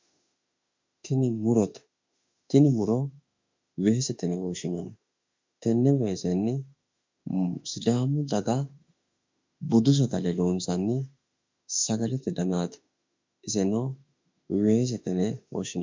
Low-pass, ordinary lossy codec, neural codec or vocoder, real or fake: 7.2 kHz; MP3, 64 kbps; autoencoder, 48 kHz, 32 numbers a frame, DAC-VAE, trained on Japanese speech; fake